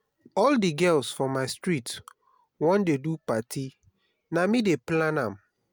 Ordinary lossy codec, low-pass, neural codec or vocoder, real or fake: none; none; none; real